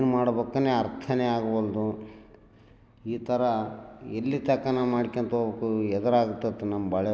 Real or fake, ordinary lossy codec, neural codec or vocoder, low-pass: real; none; none; none